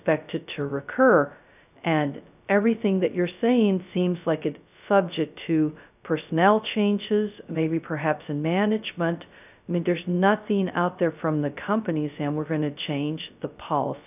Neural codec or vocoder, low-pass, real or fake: codec, 16 kHz, 0.2 kbps, FocalCodec; 3.6 kHz; fake